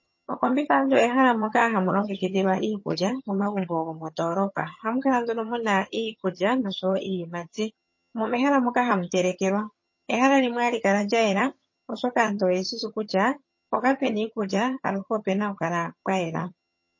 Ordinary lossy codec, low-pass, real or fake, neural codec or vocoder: MP3, 32 kbps; 7.2 kHz; fake; vocoder, 22.05 kHz, 80 mel bands, HiFi-GAN